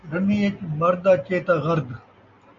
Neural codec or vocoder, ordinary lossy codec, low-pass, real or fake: none; MP3, 48 kbps; 7.2 kHz; real